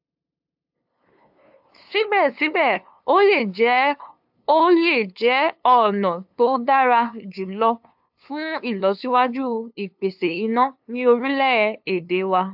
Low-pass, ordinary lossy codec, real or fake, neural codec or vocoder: 5.4 kHz; AAC, 48 kbps; fake; codec, 16 kHz, 2 kbps, FunCodec, trained on LibriTTS, 25 frames a second